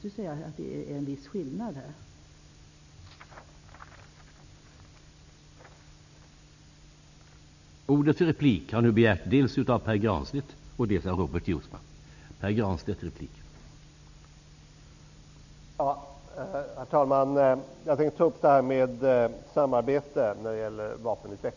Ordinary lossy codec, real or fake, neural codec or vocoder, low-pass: none; real; none; 7.2 kHz